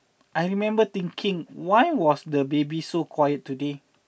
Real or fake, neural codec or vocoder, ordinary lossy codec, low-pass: real; none; none; none